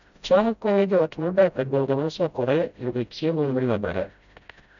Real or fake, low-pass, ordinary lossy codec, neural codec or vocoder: fake; 7.2 kHz; none; codec, 16 kHz, 0.5 kbps, FreqCodec, smaller model